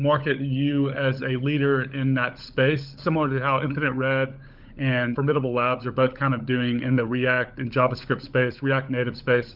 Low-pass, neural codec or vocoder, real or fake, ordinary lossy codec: 5.4 kHz; codec, 16 kHz, 16 kbps, FunCodec, trained on LibriTTS, 50 frames a second; fake; Opus, 24 kbps